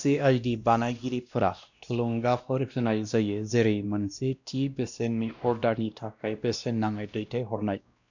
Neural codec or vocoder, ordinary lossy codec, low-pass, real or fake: codec, 16 kHz, 1 kbps, X-Codec, WavLM features, trained on Multilingual LibriSpeech; none; 7.2 kHz; fake